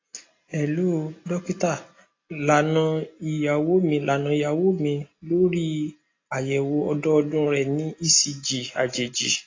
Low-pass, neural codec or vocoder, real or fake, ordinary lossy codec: 7.2 kHz; none; real; AAC, 32 kbps